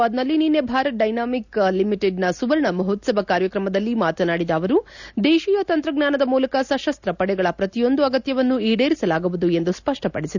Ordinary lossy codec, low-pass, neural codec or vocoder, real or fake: none; 7.2 kHz; none; real